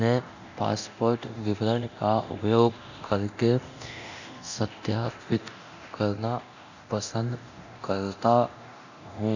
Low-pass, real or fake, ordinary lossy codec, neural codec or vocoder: 7.2 kHz; fake; none; codec, 24 kHz, 0.9 kbps, DualCodec